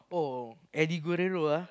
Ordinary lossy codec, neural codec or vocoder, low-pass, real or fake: none; none; none; real